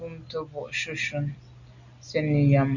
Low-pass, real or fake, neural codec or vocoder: 7.2 kHz; real; none